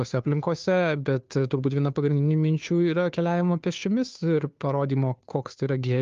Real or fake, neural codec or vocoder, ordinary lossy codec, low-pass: fake; codec, 16 kHz, 2 kbps, FunCodec, trained on Chinese and English, 25 frames a second; Opus, 32 kbps; 7.2 kHz